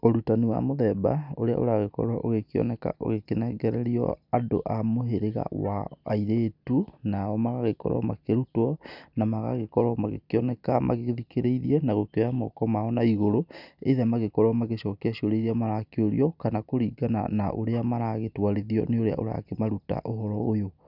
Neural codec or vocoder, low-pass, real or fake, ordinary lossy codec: none; 5.4 kHz; real; none